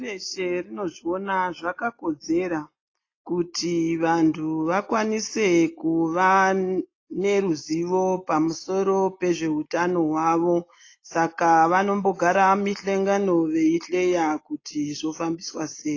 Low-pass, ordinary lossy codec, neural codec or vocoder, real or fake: 7.2 kHz; AAC, 32 kbps; none; real